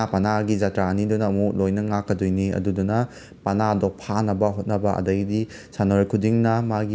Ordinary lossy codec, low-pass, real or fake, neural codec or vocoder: none; none; real; none